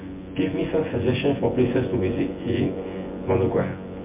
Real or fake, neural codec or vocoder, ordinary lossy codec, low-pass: fake; vocoder, 24 kHz, 100 mel bands, Vocos; MP3, 24 kbps; 3.6 kHz